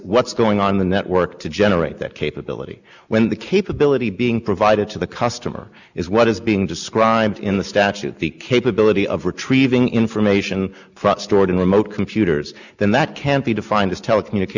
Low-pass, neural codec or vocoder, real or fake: 7.2 kHz; none; real